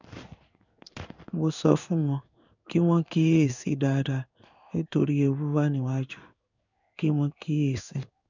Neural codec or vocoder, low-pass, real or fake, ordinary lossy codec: codec, 16 kHz in and 24 kHz out, 1 kbps, XY-Tokenizer; 7.2 kHz; fake; none